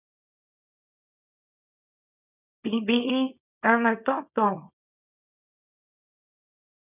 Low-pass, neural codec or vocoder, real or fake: 3.6 kHz; codec, 24 kHz, 0.9 kbps, WavTokenizer, small release; fake